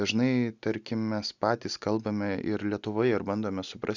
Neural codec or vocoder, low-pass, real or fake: none; 7.2 kHz; real